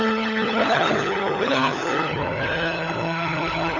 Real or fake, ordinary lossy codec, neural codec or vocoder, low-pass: fake; none; codec, 16 kHz, 16 kbps, FunCodec, trained on LibriTTS, 50 frames a second; 7.2 kHz